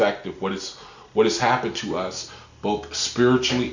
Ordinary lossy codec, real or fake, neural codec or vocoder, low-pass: Opus, 64 kbps; real; none; 7.2 kHz